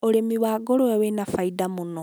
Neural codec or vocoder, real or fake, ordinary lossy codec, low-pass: none; real; none; none